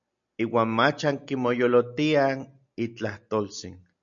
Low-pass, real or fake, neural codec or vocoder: 7.2 kHz; real; none